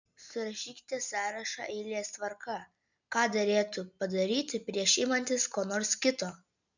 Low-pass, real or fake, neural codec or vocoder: 7.2 kHz; real; none